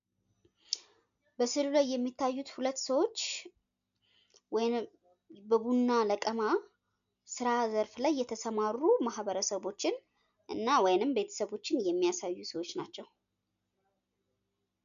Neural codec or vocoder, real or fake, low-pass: none; real; 7.2 kHz